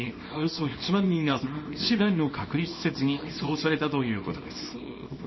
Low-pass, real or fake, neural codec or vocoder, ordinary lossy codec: 7.2 kHz; fake; codec, 24 kHz, 0.9 kbps, WavTokenizer, small release; MP3, 24 kbps